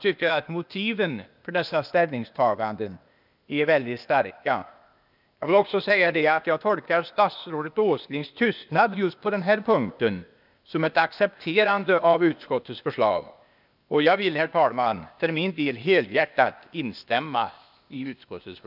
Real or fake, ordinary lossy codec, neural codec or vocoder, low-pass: fake; none; codec, 16 kHz, 0.8 kbps, ZipCodec; 5.4 kHz